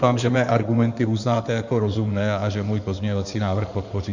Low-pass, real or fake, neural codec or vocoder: 7.2 kHz; fake; codec, 16 kHz in and 24 kHz out, 2.2 kbps, FireRedTTS-2 codec